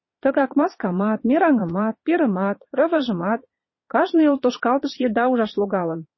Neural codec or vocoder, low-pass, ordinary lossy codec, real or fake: vocoder, 22.05 kHz, 80 mel bands, Vocos; 7.2 kHz; MP3, 24 kbps; fake